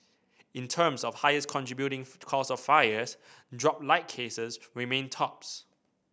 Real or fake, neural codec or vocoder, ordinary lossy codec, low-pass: real; none; none; none